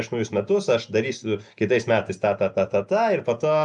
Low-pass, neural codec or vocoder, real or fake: 10.8 kHz; none; real